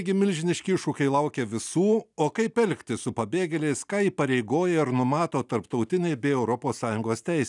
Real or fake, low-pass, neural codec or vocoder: real; 10.8 kHz; none